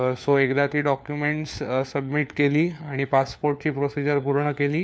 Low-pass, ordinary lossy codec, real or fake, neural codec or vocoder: none; none; fake; codec, 16 kHz, 4 kbps, FunCodec, trained on LibriTTS, 50 frames a second